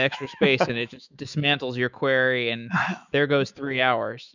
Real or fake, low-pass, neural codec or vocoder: fake; 7.2 kHz; autoencoder, 48 kHz, 128 numbers a frame, DAC-VAE, trained on Japanese speech